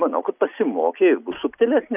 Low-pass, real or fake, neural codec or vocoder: 3.6 kHz; real; none